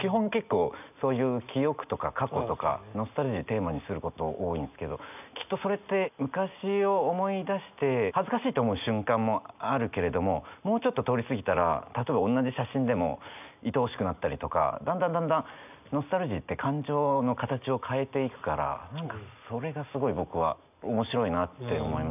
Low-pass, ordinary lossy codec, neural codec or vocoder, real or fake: 3.6 kHz; none; none; real